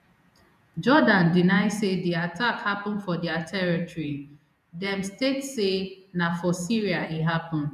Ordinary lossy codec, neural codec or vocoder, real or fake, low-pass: none; vocoder, 48 kHz, 128 mel bands, Vocos; fake; 14.4 kHz